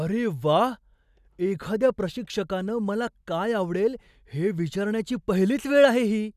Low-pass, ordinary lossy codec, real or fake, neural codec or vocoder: 14.4 kHz; none; real; none